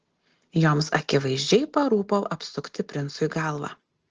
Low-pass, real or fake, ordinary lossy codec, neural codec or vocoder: 7.2 kHz; real; Opus, 16 kbps; none